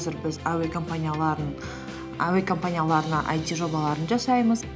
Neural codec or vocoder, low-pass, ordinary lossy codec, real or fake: none; none; none; real